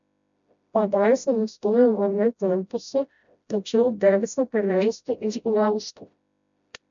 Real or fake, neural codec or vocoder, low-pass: fake; codec, 16 kHz, 0.5 kbps, FreqCodec, smaller model; 7.2 kHz